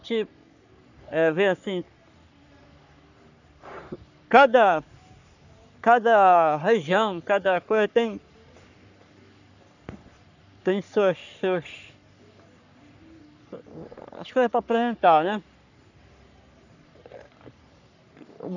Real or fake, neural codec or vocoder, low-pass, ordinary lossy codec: fake; codec, 44.1 kHz, 3.4 kbps, Pupu-Codec; 7.2 kHz; none